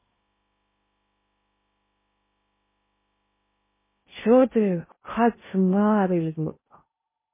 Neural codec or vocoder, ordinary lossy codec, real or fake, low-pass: codec, 16 kHz in and 24 kHz out, 0.6 kbps, FocalCodec, streaming, 2048 codes; MP3, 16 kbps; fake; 3.6 kHz